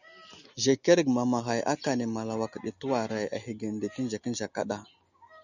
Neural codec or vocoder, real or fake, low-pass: none; real; 7.2 kHz